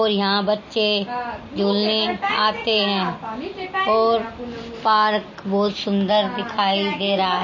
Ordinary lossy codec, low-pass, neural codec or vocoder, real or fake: MP3, 32 kbps; 7.2 kHz; none; real